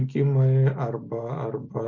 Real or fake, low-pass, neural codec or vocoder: real; 7.2 kHz; none